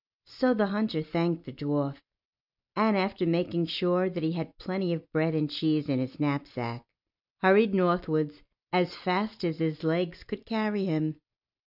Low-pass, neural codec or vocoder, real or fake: 5.4 kHz; none; real